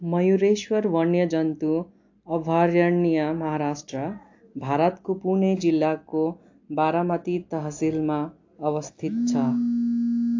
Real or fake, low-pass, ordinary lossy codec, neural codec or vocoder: real; 7.2 kHz; AAC, 48 kbps; none